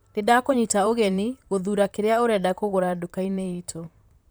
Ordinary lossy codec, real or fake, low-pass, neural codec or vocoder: none; fake; none; vocoder, 44.1 kHz, 128 mel bands, Pupu-Vocoder